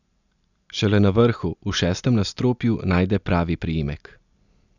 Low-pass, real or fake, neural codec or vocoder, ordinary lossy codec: 7.2 kHz; real; none; none